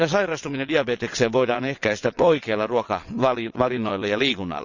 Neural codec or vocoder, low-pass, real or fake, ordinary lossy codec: vocoder, 22.05 kHz, 80 mel bands, WaveNeXt; 7.2 kHz; fake; none